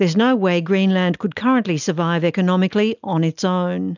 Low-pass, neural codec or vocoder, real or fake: 7.2 kHz; none; real